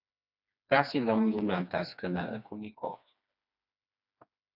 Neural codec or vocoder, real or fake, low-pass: codec, 16 kHz, 2 kbps, FreqCodec, smaller model; fake; 5.4 kHz